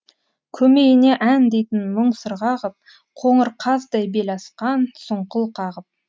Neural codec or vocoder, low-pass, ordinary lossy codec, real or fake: none; none; none; real